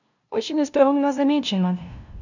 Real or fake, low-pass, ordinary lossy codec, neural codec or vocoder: fake; 7.2 kHz; none; codec, 16 kHz, 0.5 kbps, FunCodec, trained on LibriTTS, 25 frames a second